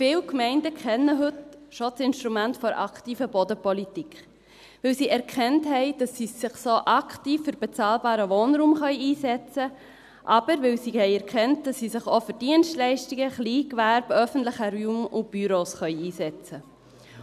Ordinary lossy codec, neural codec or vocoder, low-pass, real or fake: none; none; 14.4 kHz; real